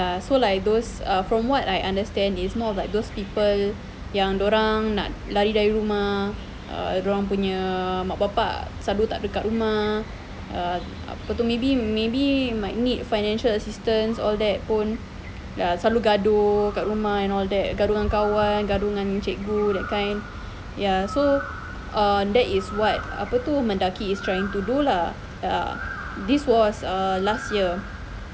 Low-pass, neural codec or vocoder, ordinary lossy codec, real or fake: none; none; none; real